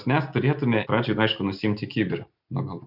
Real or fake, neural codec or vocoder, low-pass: real; none; 5.4 kHz